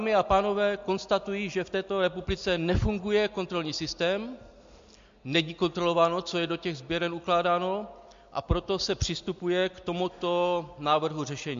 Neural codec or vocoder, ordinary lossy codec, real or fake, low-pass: none; MP3, 48 kbps; real; 7.2 kHz